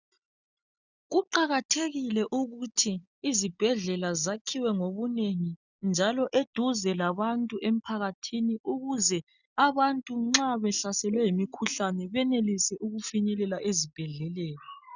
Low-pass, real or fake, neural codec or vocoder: 7.2 kHz; real; none